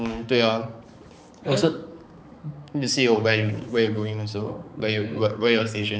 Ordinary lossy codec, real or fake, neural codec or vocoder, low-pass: none; fake; codec, 16 kHz, 4 kbps, X-Codec, HuBERT features, trained on balanced general audio; none